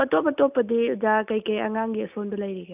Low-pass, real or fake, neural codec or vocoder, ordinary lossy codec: 3.6 kHz; real; none; none